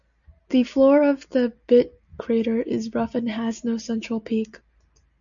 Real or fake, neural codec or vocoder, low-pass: real; none; 7.2 kHz